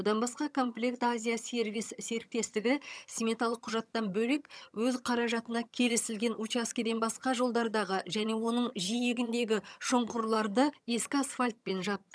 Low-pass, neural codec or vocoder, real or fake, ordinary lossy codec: none; vocoder, 22.05 kHz, 80 mel bands, HiFi-GAN; fake; none